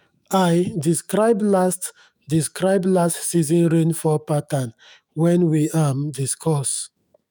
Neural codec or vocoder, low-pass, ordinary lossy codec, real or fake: autoencoder, 48 kHz, 128 numbers a frame, DAC-VAE, trained on Japanese speech; none; none; fake